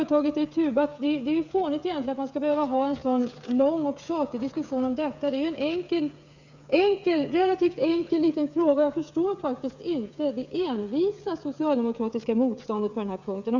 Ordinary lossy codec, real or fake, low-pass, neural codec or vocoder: none; fake; 7.2 kHz; codec, 16 kHz, 8 kbps, FreqCodec, smaller model